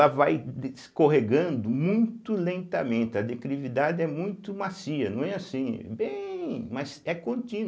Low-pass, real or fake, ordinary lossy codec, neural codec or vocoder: none; real; none; none